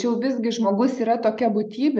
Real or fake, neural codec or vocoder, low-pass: real; none; 9.9 kHz